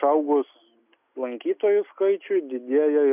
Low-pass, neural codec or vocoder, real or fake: 3.6 kHz; none; real